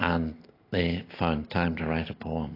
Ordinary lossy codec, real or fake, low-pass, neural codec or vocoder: AAC, 24 kbps; real; 5.4 kHz; none